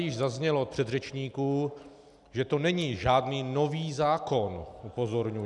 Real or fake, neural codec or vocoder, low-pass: real; none; 10.8 kHz